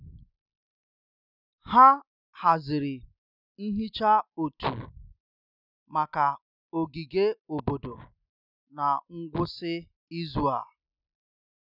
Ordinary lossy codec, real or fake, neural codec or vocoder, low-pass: none; real; none; 5.4 kHz